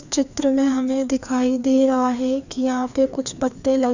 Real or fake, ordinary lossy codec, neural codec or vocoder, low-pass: fake; none; codec, 16 kHz, 2 kbps, FreqCodec, larger model; 7.2 kHz